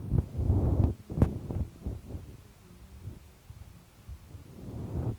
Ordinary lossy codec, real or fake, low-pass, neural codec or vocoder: Opus, 32 kbps; real; 19.8 kHz; none